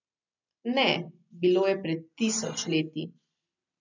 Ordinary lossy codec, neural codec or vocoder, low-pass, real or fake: none; none; 7.2 kHz; real